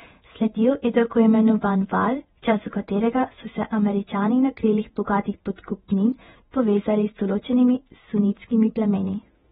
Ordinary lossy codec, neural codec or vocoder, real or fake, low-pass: AAC, 16 kbps; vocoder, 48 kHz, 128 mel bands, Vocos; fake; 19.8 kHz